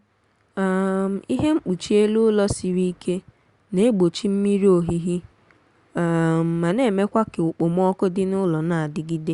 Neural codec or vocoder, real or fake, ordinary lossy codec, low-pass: none; real; Opus, 64 kbps; 10.8 kHz